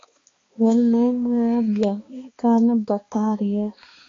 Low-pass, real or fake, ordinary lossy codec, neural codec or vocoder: 7.2 kHz; fake; AAC, 32 kbps; codec, 16 kHz, 2 kbps, X-Codec, HuBERT features, trained on balanced general audio